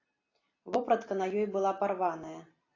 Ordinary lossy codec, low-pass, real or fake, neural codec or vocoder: AAC, 32 kbps; 7.2 kHz; real; none